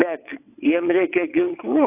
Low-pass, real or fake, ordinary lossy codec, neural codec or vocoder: 3.6 kHz; fake; AAC, 32 kbps; vocoder, 22.05 kHz, 80 mel bands, WaveNeXt